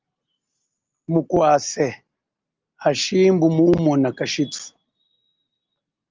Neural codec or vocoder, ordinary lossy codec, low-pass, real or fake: none; Opus, 24 kbps; 7.2 kHz; real